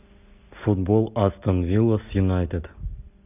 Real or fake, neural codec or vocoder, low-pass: real; none; 3.6 kHz